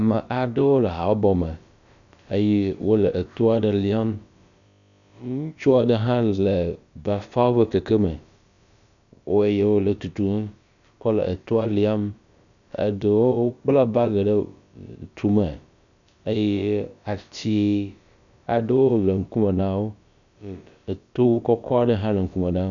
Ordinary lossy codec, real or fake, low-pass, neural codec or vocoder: MP3, 96 kbps; fake; 7.2 kHz; codec, 16 kHz, about 1 kbps, DyCAST, with the encoder's durations